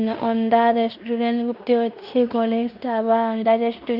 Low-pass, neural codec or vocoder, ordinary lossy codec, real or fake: 5.4 kHz; codec, 16 kHz in and 24 kHz out, 0.9 kbps, LongCat-Audio-Codec, fine tuned four codebook decoder; Opus, 64 kbps; fake